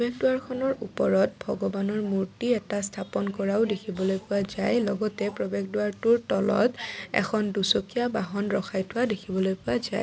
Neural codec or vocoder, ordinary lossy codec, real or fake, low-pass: none; none; real; none